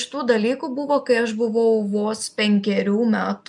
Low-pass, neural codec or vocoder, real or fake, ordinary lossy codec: 10.8 kHz; none; real; MP3, 96 kbps